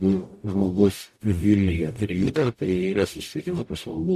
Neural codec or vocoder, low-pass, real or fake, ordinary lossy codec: codec, 44.1 kHz, 0.9 kbps, DAC; 14.4 kHz; fake; MP3, 64 kbps